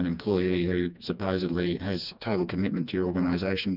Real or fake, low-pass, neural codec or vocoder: fake; 5.4 kHz; codec, 16 kHz, 2 kbps, FreqCodec, smaller model